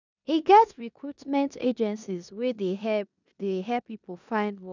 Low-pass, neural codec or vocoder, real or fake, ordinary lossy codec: 7.2 kHz; codec, 16 kHz in and 24 kHz out, 0.9 kbps, LongCat-Audio-Codec, four codebook decoder; fake; none